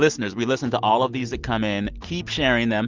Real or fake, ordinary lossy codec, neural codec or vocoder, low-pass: real; Opus, 24 kbps; none; 7.2 kHz